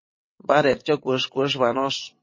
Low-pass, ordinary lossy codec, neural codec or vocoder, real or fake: 7.2 kHz; MP3, 32 kbps; vocoder, 22.05 kHz, 80 mel bands, WaveNeXt; fake